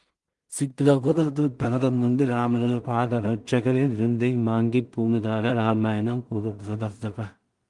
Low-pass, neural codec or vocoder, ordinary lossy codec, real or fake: 10.8 kHz; codec, 16 kHz in and 24 kHz out, 0.4 kbps, LongCat-Audio-Codec, two codebook decoder; Opus, 24 kbps; fake